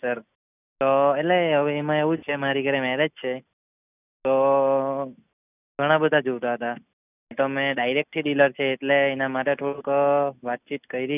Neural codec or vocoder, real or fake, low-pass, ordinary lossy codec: none; real; 3.6 kHz; none